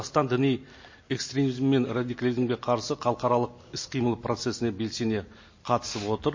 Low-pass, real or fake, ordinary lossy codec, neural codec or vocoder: 7.2 kHz; real; MP3, 32 kbps; none